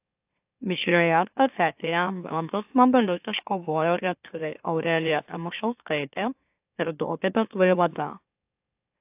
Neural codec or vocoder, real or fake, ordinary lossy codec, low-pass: autoencoder, 44.1 kHz, a latent of 192 numbers a frame, MeloTTS; fake; AAC, 32 kbps; 3.6 kHz